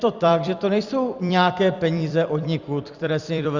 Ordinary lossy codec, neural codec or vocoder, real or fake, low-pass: Opus, 64 kbps; vocoder, 44.1 kHz, 128 mel bands every 256 samples, BigVGAN v2; fake; 7.2 kHz